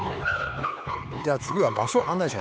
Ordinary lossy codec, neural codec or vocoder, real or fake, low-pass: none; codec, 16 kHz, 4 kbps, X-Codec, HuBERT features, trained on LibriSpeech; fake; none